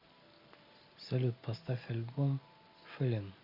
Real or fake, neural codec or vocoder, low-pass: real; none; 5.4 kHz